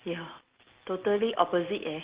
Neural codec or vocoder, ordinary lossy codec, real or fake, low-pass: none; Opus, 16 kbps; real; 3.6 kHz